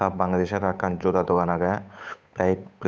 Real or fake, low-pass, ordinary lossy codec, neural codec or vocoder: fake; none; none; codec, 16 kHz, 8 kbps, FunCodec, trained on Chinese and English, 25 frames a second